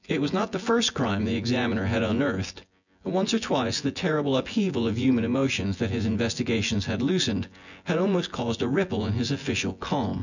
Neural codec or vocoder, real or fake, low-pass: vocoder, 24 kHz, 100 mel bands, Vocos; fake; 7.2 kHz